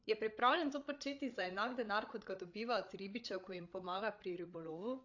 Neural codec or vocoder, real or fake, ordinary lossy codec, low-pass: codec, 16 kHz, 8 kbps, FreqCodec, larger model; fake; AAC, 48 kbps; 7.2 kHz